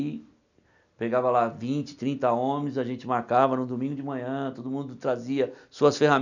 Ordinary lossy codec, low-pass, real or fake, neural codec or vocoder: none; 7.2 kHz; real; none